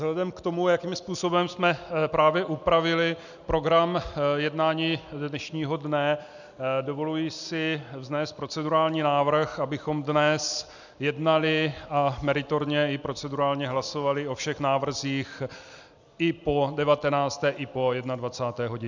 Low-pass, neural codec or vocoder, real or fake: 7.2 kHz; none; real